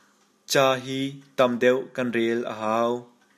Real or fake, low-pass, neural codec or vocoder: real; 14.4 kHz; none